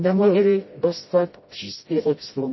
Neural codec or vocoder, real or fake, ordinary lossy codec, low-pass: codec, 16 kHz, 0.5 kbps, FreqCodec, smaller model; fake; MP3, 24 kbps; 7.2 kHz